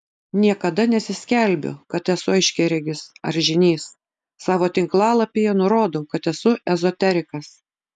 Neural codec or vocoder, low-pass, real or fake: none; 10.8 kHz; real